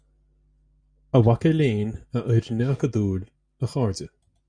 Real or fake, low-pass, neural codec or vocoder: real; 9.9 kHz; none